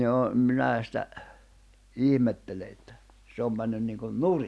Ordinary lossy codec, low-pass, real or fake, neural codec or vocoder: none; none; real; none